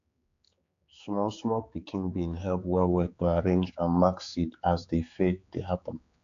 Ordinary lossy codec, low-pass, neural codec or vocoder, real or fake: AAC, 64 kbps; 7.2 kHz; codec, 16 kHz, 4 kbps, X-Codec, HuBERT features, trained on general audio; fake